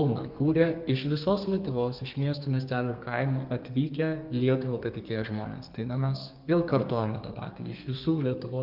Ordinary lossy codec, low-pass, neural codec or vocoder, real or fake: Opus, 24 kbps; 5.4 kHz; codec, 32 kHz, 1.9 kbps, SNAC; fake